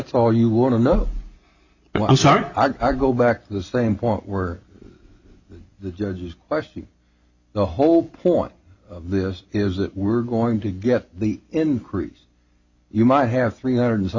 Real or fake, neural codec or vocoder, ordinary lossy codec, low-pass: real; none; Opus, 64 kbps; 7.2 kHz